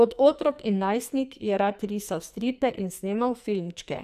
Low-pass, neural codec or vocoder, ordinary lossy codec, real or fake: 14.4 kHz; codec, 44.1 kHz, 2.6 kbps, SNAC; none; fake